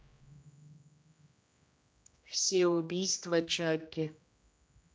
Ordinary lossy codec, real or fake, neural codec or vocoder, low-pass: none; fake; codec, 16 kHz, 1 kbps, X-Codec, HuBERT features, trained on general audio; none